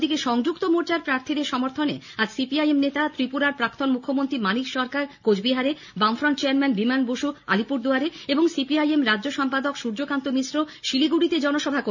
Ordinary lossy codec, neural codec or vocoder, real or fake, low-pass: none; none; real; 7.2 kHz